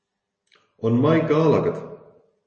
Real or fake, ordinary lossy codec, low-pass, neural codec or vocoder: real; MP3, 32 kbps; 9.9 kHz; none